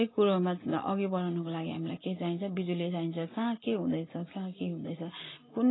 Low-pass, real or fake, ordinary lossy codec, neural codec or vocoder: 7.2 kHz; real; AAC, 16 kbps; none